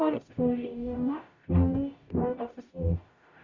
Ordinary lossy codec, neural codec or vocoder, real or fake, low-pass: Opus, 64 kbps; codec, 44.1 kHz, 0.9 kbps, DAC; fake; 7.2 kHz